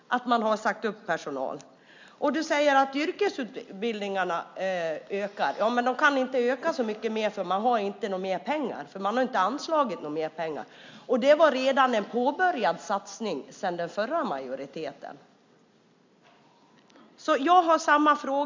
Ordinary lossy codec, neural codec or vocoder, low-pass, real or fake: MP3, 64 kbps; none; 7.2 kHz; real